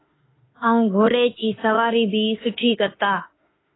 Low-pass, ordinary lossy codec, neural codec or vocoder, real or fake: 7.2 kHz; AAC, 16 kbps; autoencoder, 48 kHz, 32 numbers a frame, DAC-VAE, trained on Japanese speech; fake